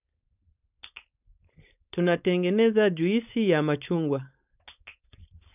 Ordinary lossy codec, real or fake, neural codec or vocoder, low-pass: none; fake; codec, 16 kHz, 4.8 kbps, FACodec; 3.6 kHz